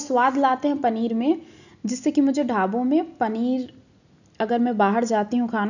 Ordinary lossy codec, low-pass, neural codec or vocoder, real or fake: none; 7.2 kHz; none; real